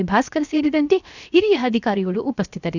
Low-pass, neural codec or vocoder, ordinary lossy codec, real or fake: 7.2 kHz; codec, 16 kHz, 0.7 kbps, FocalCodec; none; fake